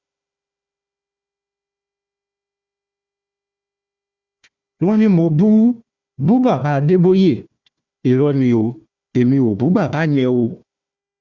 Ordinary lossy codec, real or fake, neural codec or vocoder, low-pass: Opus, 64 kbps; fake; codec, 16 kHz, 1 kbps, FunCodec, trained on Chinese and English, 50 frames a second; 7.2 kHz